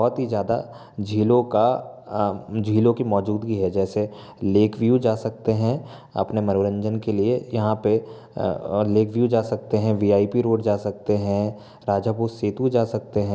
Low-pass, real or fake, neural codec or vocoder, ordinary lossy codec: none; real; none; none